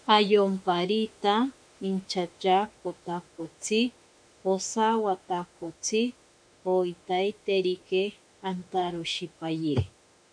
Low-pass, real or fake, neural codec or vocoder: 9.9 kHz; fake; autoencoder, 48 kHz, 32 numbers a frame, DAC-VAE, trained on Japanese speech